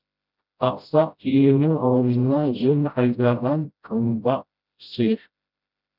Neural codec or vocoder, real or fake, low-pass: codec, 16 kHz, 0.5 kbps, FreqCodec, smaller model; fake; 5.4 kHz